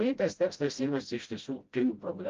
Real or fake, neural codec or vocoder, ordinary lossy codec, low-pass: fake; codec, 16 kHz, 0.5 kbps, FreqCodec, smaller model; Opus, 32 kbps; 7.2 kHz